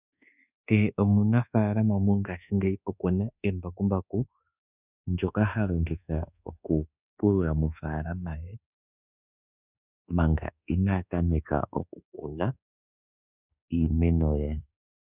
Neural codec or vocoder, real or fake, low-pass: codec, 24 kHz, 1.2 kbps, DualCodec; fake; 3.6 kHz